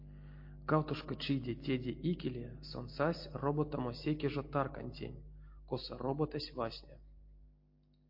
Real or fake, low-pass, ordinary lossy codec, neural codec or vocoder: real; 5.4 kHz; MP3, 32 kbps; none